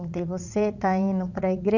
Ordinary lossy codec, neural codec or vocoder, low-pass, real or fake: none; vocoder, 44.1 kHz, 80 mel bands, Vocos; 7.2 kHz; fake